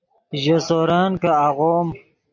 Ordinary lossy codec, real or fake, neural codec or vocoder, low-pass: MP3, 48 kbps; real; none; 7.2 kHz